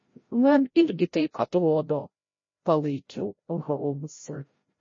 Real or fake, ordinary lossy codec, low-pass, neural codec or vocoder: fake; MP3, 32 kbps; 7.2 kHz; codec, 16 kHz, 0.5 kbps, FreqCodec, larger model